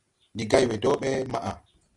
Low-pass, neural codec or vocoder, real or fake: 10.8 kHz; none; real